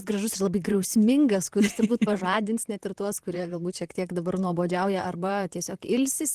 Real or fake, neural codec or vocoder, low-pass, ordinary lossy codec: fake; vocoder, 44.1 kHz, 128 mel bands, Pupu-Vocoder; 14.4 kHz; Opus, 16 kbps